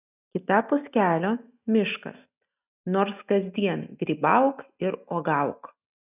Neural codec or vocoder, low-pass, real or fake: none; 3.6 kHz; real